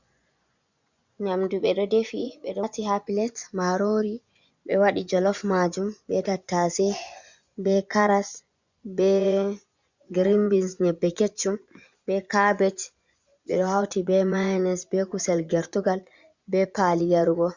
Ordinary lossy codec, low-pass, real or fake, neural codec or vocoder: Opus, 64 kbps; 7.2 kHz; fake; vocoder, 24 kHz, 100 mel bands, Vocos